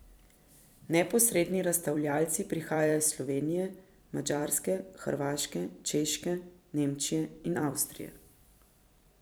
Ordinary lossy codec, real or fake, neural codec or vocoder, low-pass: none; fake; vocoder, 44.1 kHz, 128 mel bands every 256 samples, BigVGAN v2; none